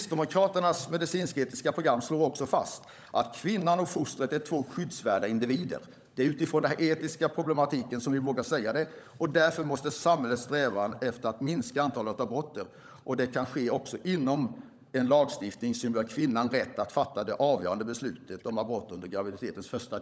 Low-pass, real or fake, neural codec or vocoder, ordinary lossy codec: none; fake; codec, 16 kHz, 16 kbps, FunCodec, trained on LibriTTS, 50 frames a second; none